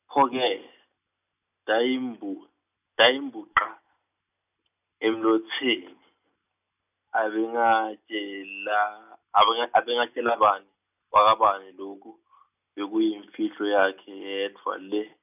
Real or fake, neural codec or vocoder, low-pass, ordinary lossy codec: real; none; 3.6 kHz; none